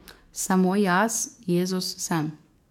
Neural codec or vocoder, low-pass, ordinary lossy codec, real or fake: codec, 44.1 kHz, 7.8 kbps, DAC; 19.8 kHz; MP3, 96 kbps; fake